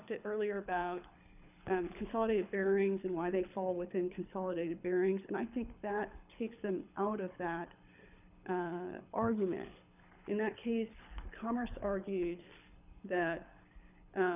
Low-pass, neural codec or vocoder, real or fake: 3.6 kHz; codec, 24 kHz, 6 kbps, HILCodec; fake